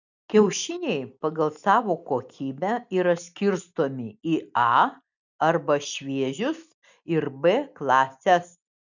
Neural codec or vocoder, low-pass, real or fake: none; 7.2 kHz; real